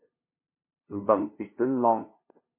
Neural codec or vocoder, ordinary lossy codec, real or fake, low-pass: codec, 16 kHz, 0.5 kbps, FunCodec, trained on LibriTTS, 25 frames a second; MP3, 24 kbps; fake; 3.6 kHz